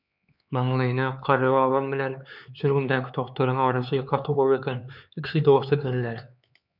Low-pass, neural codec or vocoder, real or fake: 5.4 kHz; codec, 16 kHz, 4 kbps, X-Codec, HuBERT features, trained on LibriSpeech; fake